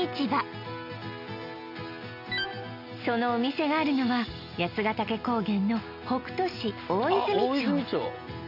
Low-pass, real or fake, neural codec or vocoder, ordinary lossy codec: 5.4 kHz; real; none; none